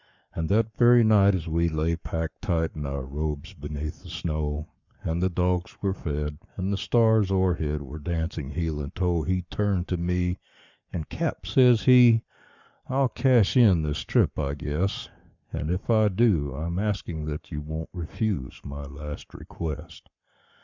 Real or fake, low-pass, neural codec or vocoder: fake; 7.2 kHz; codec, 44.1 kHz, 7.8 kbps, Pupu-Codec